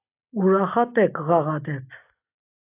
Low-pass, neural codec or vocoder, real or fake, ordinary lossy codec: 3.6 kHz; none; real; AAC, 24 kbps